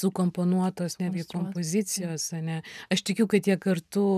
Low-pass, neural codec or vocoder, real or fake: 14.4 kHz; vocoder, 44.1 kHz, 128 mel bands every 512 samples, BigVGAN v2; fake